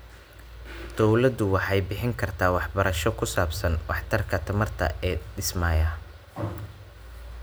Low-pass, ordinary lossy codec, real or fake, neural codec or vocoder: none; none; real; none